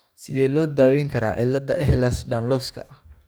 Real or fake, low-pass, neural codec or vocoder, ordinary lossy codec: fake; none; codec, 44.1 kHz, 2.6 kbps, DAC; none